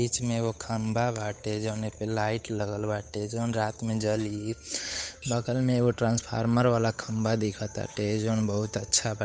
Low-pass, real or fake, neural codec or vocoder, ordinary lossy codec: none; fake; codec, 16 kHz, 8 kbps, FunCodec, trained on Chinese and English, 25 frames a second; none